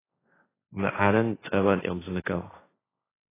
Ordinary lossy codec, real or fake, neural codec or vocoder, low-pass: AAC, 16 kbps; fake; codec, 16 kHz, 1.1 kbps, Voila-Tokenizer; 3.6 kHz